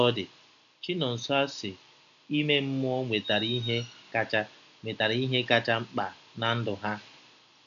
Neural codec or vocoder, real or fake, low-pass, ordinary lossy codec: none; real; 7.2 kHz; none